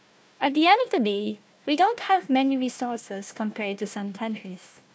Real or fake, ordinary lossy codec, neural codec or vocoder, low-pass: fake; none; codec, 16 kHz, 1 kbps, FunCodec, trained on Chinese and English, 50 frames a second; none